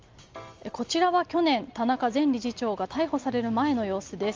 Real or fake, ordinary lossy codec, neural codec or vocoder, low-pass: real; Opus, 32 kbps; none; 7.2 kHz